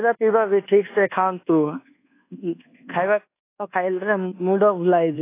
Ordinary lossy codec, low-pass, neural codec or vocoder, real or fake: AAC, 24 kbps; 3.6 kHz; codec, 24 kHz, 1.2 kbps, DualCodec; fake